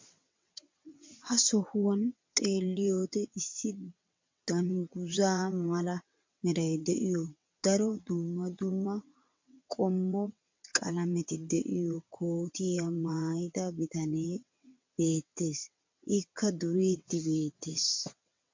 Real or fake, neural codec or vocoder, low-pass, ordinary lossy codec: fake; vocoder, 22.05 kHz, 80 mel bands, WaveNeXt; 7.2 kHz; MP3, 64 kbps